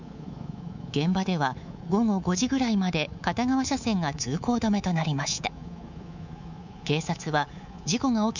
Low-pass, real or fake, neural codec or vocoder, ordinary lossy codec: 7.2 kHz; fake; codec, 24 kHz, 3.1 kbps, DualCodec; none